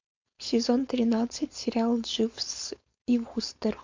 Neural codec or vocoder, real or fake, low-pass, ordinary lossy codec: codec, 16 kHz, 4.8 kbps, FACodec; fake; 7.2 kHz; MP3, 48 kbps